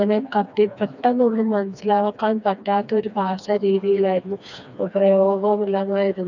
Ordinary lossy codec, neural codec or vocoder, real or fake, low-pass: none; codec, 16 kHz, 2 kbps, FreqCodec, smaller model; fake; 7.2 kHz